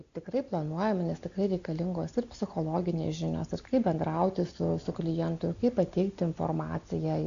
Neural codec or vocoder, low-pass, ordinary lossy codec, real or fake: none; 7.2 kHz; AAC, 96 kbps; real